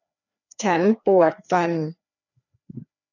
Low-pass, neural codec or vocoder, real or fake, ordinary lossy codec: 7.2 kHz; codec, 16 kHz, 2 kbps, FreqCodec, larger model; fake; none